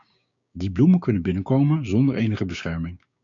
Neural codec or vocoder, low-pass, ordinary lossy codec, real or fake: codec, 16 kHz, 6 kbps, DAC; 7.2 kHz; MP3, 64 kbps; fake